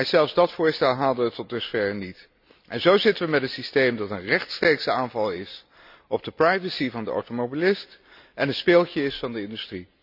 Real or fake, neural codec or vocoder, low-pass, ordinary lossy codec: real; none; 5.4 kHz; none